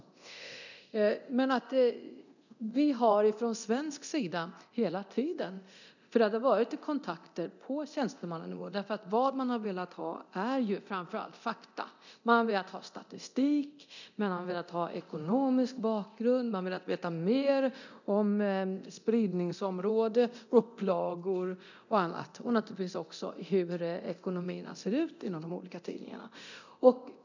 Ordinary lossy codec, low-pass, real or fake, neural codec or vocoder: none; 7.2 kHz; fake; codec, 24 kHz, 0.9 kbps, DualCodec